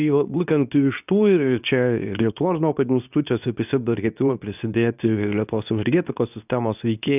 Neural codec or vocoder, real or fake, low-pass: codec, 24 kHz, 0.9 kbps, WavTokenizer, medium speech release version 2; fake; 3.6 kHz